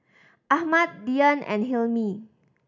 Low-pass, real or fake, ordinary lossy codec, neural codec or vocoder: 7.2 kHz; real; none; none